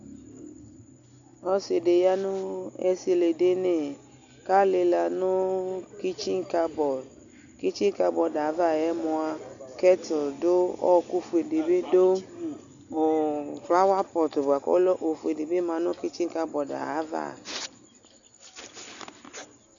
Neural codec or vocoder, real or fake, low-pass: none; real; 7.2 kHz